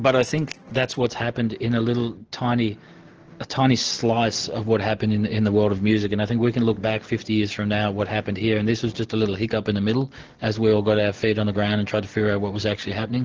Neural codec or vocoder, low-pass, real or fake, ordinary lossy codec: none; 7.2 kHz; real; Opus, 16 kbps